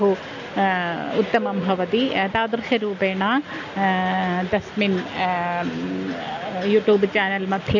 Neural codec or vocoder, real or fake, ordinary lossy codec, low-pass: none; real; none; 7.2 kHz